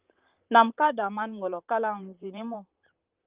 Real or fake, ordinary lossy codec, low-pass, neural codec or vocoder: fake; Opus, 32 kbps; 3.6 kHz; vocoder, 44.1 kHz, 128 mel bands, Pupu-Vocoder